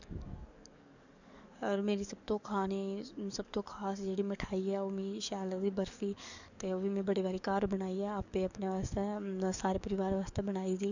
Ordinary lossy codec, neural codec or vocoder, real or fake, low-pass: none; codec, 44.1 kHz, 7.8 kbps, DAC; fake; 7.2 kHz